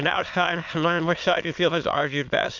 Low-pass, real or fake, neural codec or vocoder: 7.2 kHz; fake; autoencoder, 22.05 kHz, a latent of 192 numbers a frame, VITS, trained on many speakers